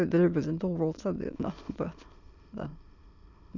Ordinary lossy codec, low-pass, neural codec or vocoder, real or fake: none; 7.2 kHz; autoencoder, 22.05 kHz, a latent of 192 numbers a frame, VITS, trained on many speakers; fake